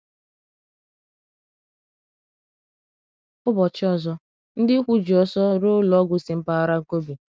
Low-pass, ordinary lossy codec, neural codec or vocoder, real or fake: none; none; none; real